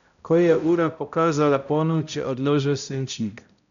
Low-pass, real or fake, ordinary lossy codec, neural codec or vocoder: 7.2 kHz; fake; none; codec, 16 kHz, 0.5 kbps, X-Codec, HuBERT features, trained on balanced general audio